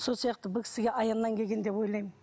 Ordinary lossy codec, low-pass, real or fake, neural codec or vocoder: none; none; real; none